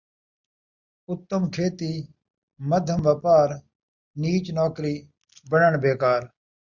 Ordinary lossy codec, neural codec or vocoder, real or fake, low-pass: Opus, 64 kbps; none; real; 7.2 kHz